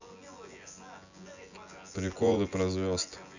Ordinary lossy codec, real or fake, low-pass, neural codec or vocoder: none; fake; 7.2 kHz; vocoder, 24 kHz, 100 mel bands, Vocos